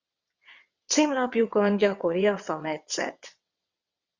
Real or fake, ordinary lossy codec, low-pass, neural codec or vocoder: fake; Opus, 64 kbps; 7.2 kHz; vocoder, 22.05 kHz, 80 mel bands, WaveNeXt